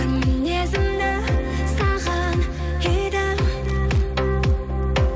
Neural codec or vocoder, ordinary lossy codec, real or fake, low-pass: none; none; real; none